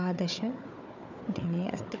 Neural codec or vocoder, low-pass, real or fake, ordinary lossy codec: codec, 16 kHz, 4 kbps, FunCodec, trained on Chinese and English, 50 frames a second; 7.2 kHz; fake; none